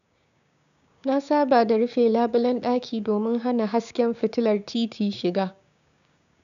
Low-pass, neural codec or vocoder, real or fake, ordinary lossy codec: 7.2 kHz; codec, 16 kHz, 6 kbps, DAC; fake; none